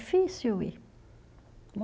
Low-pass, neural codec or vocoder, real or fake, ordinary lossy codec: none; none; real; none